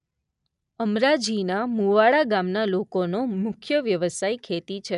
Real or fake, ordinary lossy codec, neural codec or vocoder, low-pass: real; none; none; 10.8 kHz